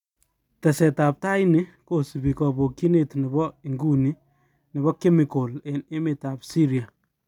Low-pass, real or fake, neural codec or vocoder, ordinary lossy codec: 19.8 kHz; real; none; none